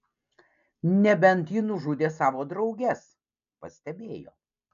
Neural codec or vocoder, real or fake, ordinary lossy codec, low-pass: none; real; MP3, 64 kbps; 7.2 kHz